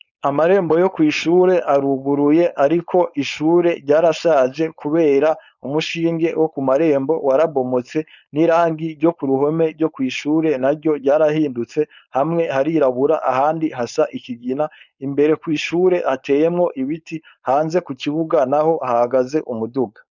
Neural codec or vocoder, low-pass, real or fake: codec, 16 kHz, 4.8 kbps, FACodec; 7.2 kHz; fake